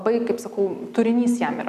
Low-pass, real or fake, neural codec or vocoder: 14.4 kHz; real; none